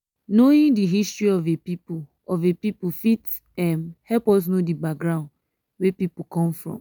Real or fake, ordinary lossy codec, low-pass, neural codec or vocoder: real; none; none; none